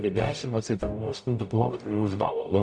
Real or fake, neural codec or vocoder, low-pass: fake; codec, 44.1 kHz, 0.9 kbps, DAC; 9.9 kHz